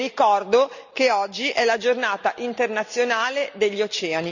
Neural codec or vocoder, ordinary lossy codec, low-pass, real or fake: none; none; 7.2 kHz; real